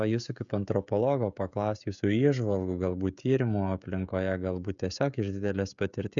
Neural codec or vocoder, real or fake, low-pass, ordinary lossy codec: codec, 16 kHz, 16 kbps, FreqCodec, smaller model; fake; 7.2 kHz; MP3, 96 kbps